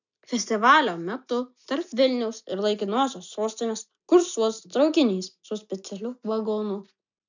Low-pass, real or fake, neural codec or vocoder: 7.2 kHz; real; none